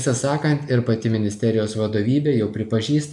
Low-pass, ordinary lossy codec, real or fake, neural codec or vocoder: 10.8 kHz; MP3, 96 kbps; real; none